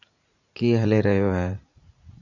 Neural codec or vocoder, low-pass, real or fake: vocoder, 44.1 kHz, 80 mel bands, Vocos; 7.2 kHz; fake